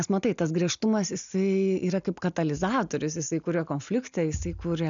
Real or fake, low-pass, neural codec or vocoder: real; 7.2 kHz; none